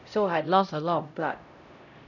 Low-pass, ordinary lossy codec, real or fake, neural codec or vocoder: 7.2 kHz; none; fake; codec, 16 kHz, 0.5 kbps, X-Codec, HuBERT features, trained on LibriSpeech